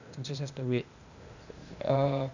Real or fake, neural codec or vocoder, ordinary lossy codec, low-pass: fake; codec, 16 kHz, 0.8 kbps, ZipCodec; none; 7.2 kHz